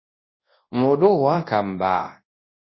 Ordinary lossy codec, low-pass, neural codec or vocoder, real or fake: MP3, 24 kbps; 7.2 kHz; codec, 24 kHz, 0.9 kbps, WavTokenizer, large speech release; fake